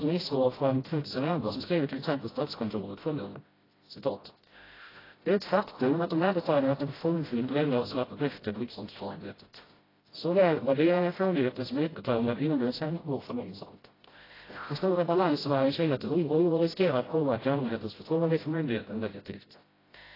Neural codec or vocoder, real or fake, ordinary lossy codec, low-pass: codec, 16 kHz, 0.5 kbps, FreqCodec, smaller model; fake; AAC, 24 kbps; 5.4 kHz